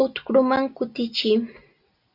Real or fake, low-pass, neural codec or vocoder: real; 5.4 kHz; none